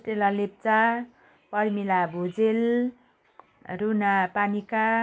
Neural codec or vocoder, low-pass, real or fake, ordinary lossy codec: none; none; real; none